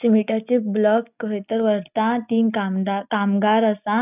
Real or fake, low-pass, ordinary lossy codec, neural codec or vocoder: real; 3.6 kHz; none; none